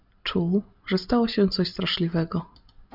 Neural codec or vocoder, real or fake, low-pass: none; real; 5.4 kHz